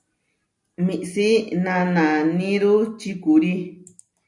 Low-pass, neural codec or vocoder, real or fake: 10.8 kHz; none; real